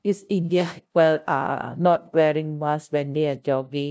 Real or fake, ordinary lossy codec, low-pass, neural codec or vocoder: fake; none; none; codec, 16 kHz, 0.5 kbps, FunCodec, trained on LibriTTS, 25 frames a second